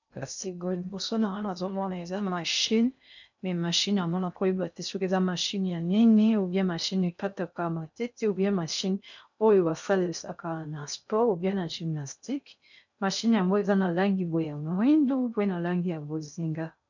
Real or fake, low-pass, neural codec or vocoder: fake; 7.2 kHz; codec, 16 kHz in and 24 kHz out, 0.6 kbps, FocalCodec, streaming, 2048 codes